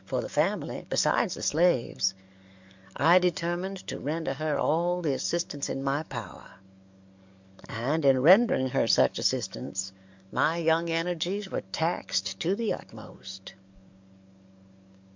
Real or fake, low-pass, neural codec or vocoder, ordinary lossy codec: fake; 7.2 kHz; codec, 44.1 kHz, 7.8 kbps, DAC; MP3, 64 kbps